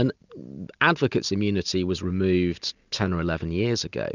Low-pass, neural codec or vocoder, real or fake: 7.2 kHz; none; real